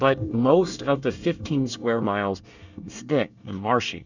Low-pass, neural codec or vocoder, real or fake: 7.2 kHz; codec, 24 kHz, 1 kbps, SNAC; fake